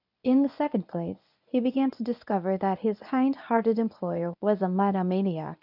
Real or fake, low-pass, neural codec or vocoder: fake; 5.4 kHz; codec, 24 kHz, 0.9 kbps, WavTokenizer, medium speech release version 1